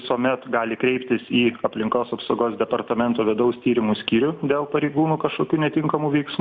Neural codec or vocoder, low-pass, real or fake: none; 7.2 kHz; real